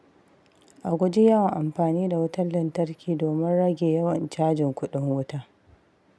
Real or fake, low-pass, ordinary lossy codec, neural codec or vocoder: real; none; none; none